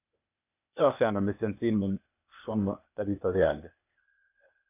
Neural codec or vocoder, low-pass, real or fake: codec, 16 kHz, 0.8 kbps, ZipCodec; 3.6 kHz; fake